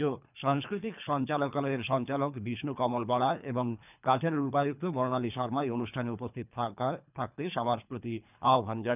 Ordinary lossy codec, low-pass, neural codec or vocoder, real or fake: none; 3.6 kHz; codec, 24 kHz, 3 kbps, HILCodec; fake